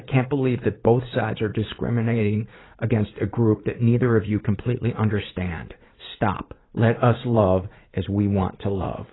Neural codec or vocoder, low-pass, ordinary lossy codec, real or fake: vocoder, 44.1 kHz, 80 mel bands, Vocos; 7.2 kHz; AAC, 16 kbps; fake